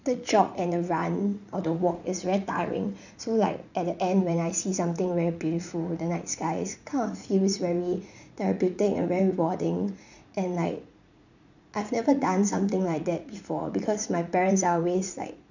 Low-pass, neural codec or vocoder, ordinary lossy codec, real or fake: 7.2 kHz; vocoder, 44.1 kHz, 80 mel bands, Vocos; none; fake